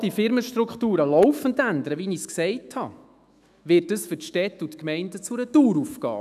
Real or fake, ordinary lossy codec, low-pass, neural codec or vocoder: fake; none; 14.4 kHz; autoencoder, 48 kHz, 128 numbers a frame, DAC-VAE, trained on Japanese speech